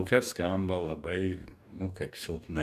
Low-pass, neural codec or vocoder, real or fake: 14.4 kHz; codec, 44.1 kHz, 2.6 kbps, DAC; fake